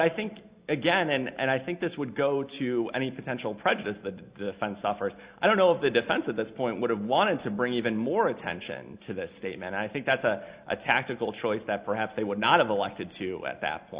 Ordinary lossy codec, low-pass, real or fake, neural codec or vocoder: Opus, 64 kbps; 3.6 kHz; real; none